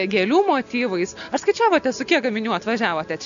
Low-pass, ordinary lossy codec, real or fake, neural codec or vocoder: 7.2 kHz; MP3, 96 kbps; real; none